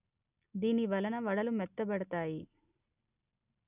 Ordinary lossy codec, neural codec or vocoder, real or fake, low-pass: none; none; real; 3.6 kHz